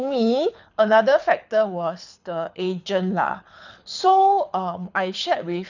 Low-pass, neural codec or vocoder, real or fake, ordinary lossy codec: 7.2 kHz; codec, 24 kHz, 6 kbps, HILCodec; fake; none